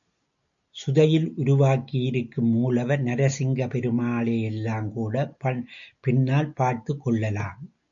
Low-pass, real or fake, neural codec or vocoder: 7.2 kHz; real; none